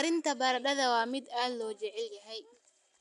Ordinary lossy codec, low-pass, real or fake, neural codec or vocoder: AAC, 96 kbps; 10.8 kHz; real; none